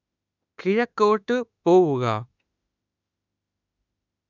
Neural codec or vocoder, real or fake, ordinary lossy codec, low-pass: autoencoder, 48 kHz, 32 numbers a frame, DAC-VAE, trained on Japanese speech; fake; none; 7.2 kHz